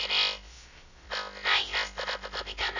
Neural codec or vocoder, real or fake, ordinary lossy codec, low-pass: codec, 16 kHz, 0.2 kbps, FocalCodec; fake; Opus, 64 kbps; 7.2 kHz